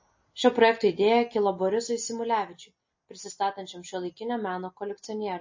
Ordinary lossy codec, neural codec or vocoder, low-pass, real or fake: MP3, 32 kbps; none; 7.2 kHz; real